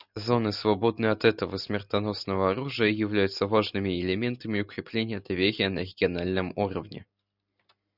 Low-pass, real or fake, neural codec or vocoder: 5.4 kHz; real; none